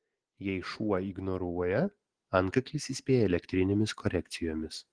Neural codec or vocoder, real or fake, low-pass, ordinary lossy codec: none; real; 9.9 kHz; Opus, 16 kbps